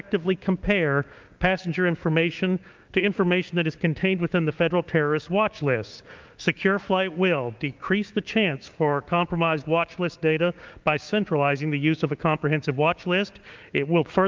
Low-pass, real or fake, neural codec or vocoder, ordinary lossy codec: 7.2 kHz; fake; codec, 16 kHz, 6 kbps, DAC; Opus, 24 kbps